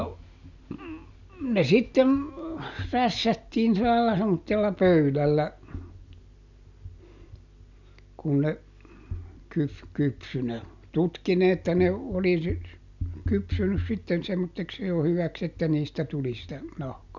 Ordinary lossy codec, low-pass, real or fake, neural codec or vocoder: none; 7.2 kHz; real; none